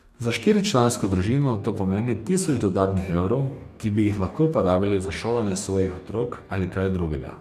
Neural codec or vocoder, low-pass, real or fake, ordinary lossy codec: codec, 44.1 kHz, 2.6 kbps, DAC; 14.4 kHz; fake; none